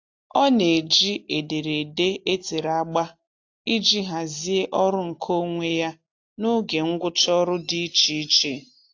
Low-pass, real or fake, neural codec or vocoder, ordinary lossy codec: 7.2 kHz; real; none; AAC, 48 kbps